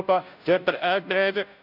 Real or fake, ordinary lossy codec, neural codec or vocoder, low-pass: fake; none; codec, 16 kHz, 0.5 kbps, FunCodec, trained on Chinese and English, 25 frames a second; 5.4 kHz